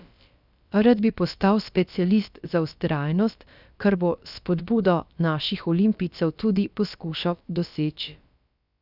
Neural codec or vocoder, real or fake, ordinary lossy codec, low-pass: codec, 16 kHz, about 1 kbps, DyCAST, with the encoder's durations; fake; none; 5.4 kHz